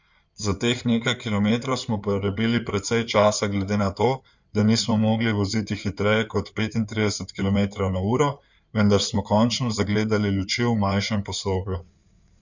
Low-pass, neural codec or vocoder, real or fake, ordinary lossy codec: 7.2 kHz; codec, 16 kHz in and 24 kHz out, 2.2 kbps, FireRedTTS-2 codec; fake; none